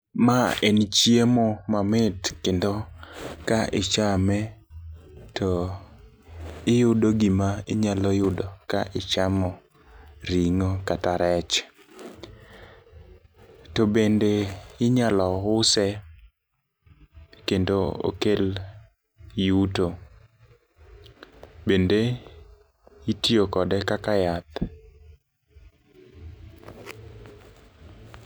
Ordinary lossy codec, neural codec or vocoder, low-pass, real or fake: none; none; none; real